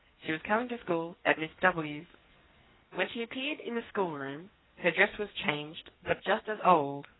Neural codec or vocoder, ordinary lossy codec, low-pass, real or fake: codec, 44.1 kHz, 2.6 kbps, SNAC; AAC, 16 kbps; 7.2 kHz; fake